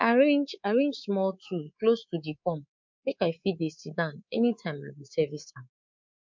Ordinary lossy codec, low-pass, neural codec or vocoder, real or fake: MP3, 48 kbps; 7.2 kHz; codec, 24 kHz, 3.1 kbps, DualCodec; fake